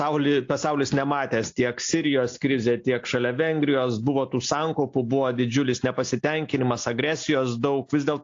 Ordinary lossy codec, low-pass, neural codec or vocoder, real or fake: AAC, 48 kbps; 7.2 kHz; none; real